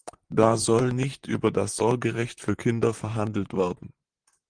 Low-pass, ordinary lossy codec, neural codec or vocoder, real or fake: 9.9 kHz; Opus, 24 kbps; vocoder, 44.1 kHz, 128 mel bands, Pupu-Vocoder; fake